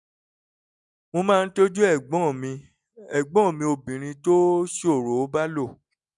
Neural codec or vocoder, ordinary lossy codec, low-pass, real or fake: none; none; 10.8 kHz; real